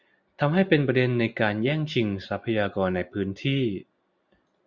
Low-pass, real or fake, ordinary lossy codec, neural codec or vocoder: 7.2 kHz; real; Opus, 64 kbps; none